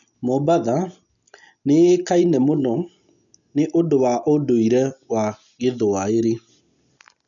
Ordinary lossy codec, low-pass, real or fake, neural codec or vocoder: none; 7.2 kHz; real; none